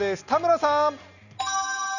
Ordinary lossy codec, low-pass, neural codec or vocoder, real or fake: none; 7.2 kHz; none; real